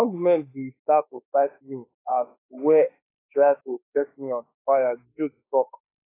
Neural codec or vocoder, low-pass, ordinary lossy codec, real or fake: autoencoder, 48 kHz, 32 numbers a frame, DAC-VAE, trained on Japanese speech; 3.6 kHz; AAC, 24 kbps; fake